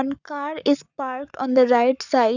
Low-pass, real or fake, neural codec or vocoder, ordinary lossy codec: 7.2 kHz; fake; codec, 44.1 kHz, 7.8 kbps, Pupu-Codec; none